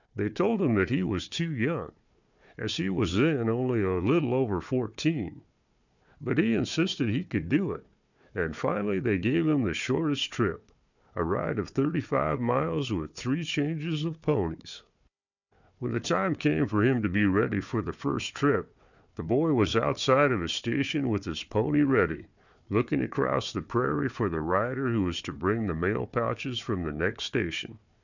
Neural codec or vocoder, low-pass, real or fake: codec, 16 kHz, 4 kbps, FunCodec, trained on Chinese and English, 50 frames a second; 7.2 kHz; fake